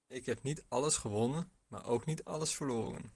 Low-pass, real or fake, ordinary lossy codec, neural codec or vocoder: 10.8 kHz; real; Opus, 24 kbps; none